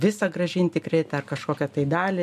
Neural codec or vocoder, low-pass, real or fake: none; 14.4 kHz; real